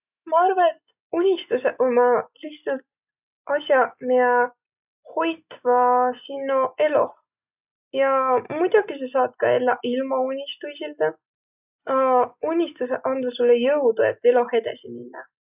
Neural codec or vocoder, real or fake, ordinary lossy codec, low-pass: none; real; none; 3.6 kHz